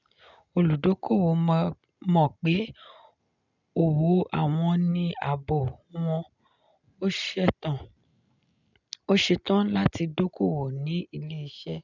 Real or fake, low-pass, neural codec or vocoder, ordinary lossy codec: real; 7.2 kHz; none; none